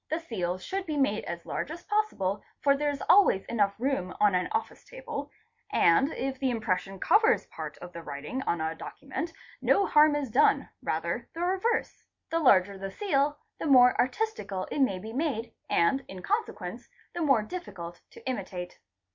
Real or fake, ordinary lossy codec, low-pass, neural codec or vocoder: real; MP3, 48 kbps; 7.2 kHz; none